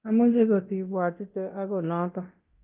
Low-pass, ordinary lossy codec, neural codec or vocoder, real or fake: 3.6 kHz; Opus, 32 kbps; codec, 24 kHz, 0.9 kbps, DualCodec; fake